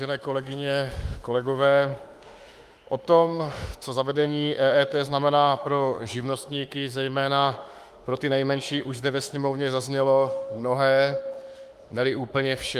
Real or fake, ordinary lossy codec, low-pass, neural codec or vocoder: fake; Opus, 32 kbps; 14.4 kHz; autoencoder, 48 kHz, 32 numbers a frame, DAC-VAE, trained on Japanese speech